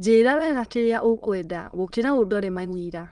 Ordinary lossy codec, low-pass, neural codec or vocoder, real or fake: Opus, 24 kbps; 9.9 kHz; autoencoder, 22.05 kHz, a latent of 192 numbers a frame, VITS, trained on many speakers; fake